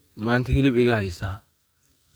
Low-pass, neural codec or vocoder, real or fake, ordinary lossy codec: none; codec, 44.1 kHz, 2.6 kbps, SNAC; fake; none